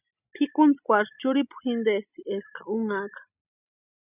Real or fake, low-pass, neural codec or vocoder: real; 3.6 kHz; none